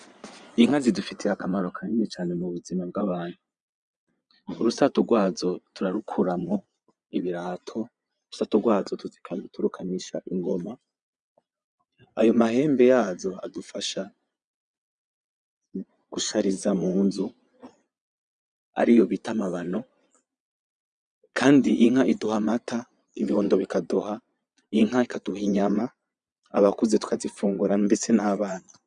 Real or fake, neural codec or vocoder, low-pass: fake; vocoder, 22.05 kHz, 80 mel bands, Vocos; 9.9 kHz